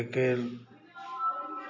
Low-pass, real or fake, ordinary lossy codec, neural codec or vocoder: 7.2 kHz; real; none; none